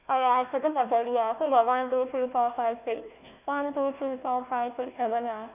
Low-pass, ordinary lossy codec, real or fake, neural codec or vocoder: 3.6 kHz; none; fake; codec, 16 kHz, 1 kbps, FunCodec, trained on Chinese and English, 50 frames a second